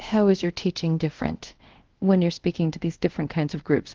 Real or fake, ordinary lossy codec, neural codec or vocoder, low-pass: fake; Opus, 16 kbps; codec, 24 kHz, 0.5 kbps, DualCodec; 7.2 kHz